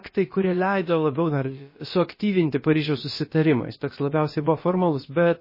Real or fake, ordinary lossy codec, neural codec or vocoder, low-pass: fake; MP3, 24 kbps; codec, 16 kHz, about 1 kbps, DyCAST, with the encoder's durations; 5.4 kHz